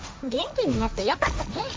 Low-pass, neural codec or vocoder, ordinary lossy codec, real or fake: none; codec, 16 kHz, 1.1 kbps, Voila-Tokenizer; none; fake